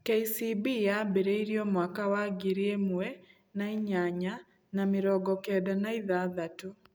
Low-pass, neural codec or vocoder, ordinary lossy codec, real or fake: none; none; none; real